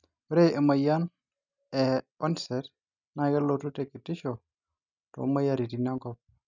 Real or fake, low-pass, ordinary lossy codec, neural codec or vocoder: real; 7.2 kHz; none; none